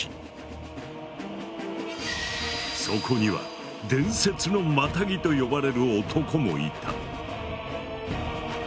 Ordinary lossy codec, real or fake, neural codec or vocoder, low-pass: none; real; none; none